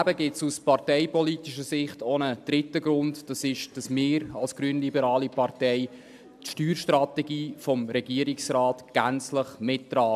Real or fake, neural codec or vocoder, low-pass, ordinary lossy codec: real; none; 14.4 kHz; AAC, 96 kbps